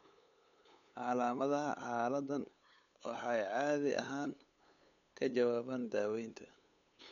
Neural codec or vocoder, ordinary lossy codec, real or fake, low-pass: codec, 16 kHz, 8 kbps, FunCodec, trained on LibriTTS, 25 frames a second; none; fake; 7.2 kHz